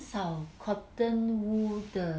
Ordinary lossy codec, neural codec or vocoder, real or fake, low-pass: none; none; real; none